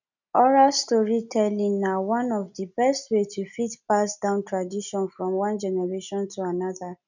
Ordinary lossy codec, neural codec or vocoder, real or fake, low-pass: none; none; real; 7.2 kHz